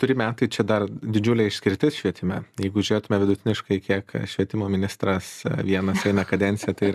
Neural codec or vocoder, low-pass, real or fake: none; 14.4 kHz; real